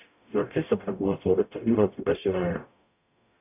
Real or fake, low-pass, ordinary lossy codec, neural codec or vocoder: fake; 3.6 kHz; AAC, 32 kbps; codec, 44.1 kHz, 0.9 kbps, DAC